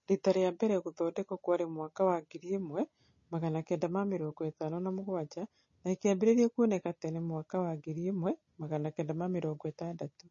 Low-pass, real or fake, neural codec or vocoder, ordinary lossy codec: 7.2 kHz; real; none; MP3, 32 kbps